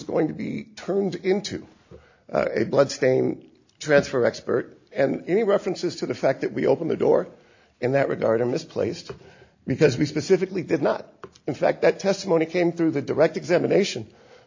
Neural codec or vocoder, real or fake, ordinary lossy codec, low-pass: none; real; AAC, 48 kbps; 7.2 kHz